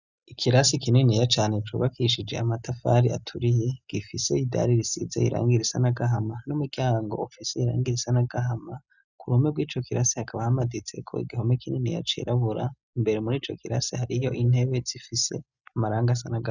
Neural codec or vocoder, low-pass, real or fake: none; 7.2 kHz; real